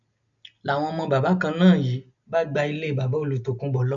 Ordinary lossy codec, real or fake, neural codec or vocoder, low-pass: none; real; none; 7.2 kHz